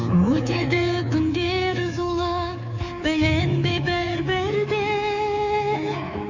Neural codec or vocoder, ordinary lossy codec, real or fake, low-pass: codec, 24 kHz, 3.1 kbps, DualCodec; none; fake; 7.2 kHz